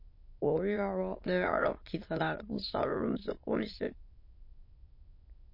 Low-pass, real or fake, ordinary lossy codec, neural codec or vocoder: 5.4 kHz; fake; MP3, 32 kbps; autoencoder, 22.05 kHz, a latent of 192 numbers a frame, VITS, trained on many speakers